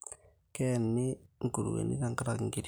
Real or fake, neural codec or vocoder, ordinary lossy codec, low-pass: real; none; none; none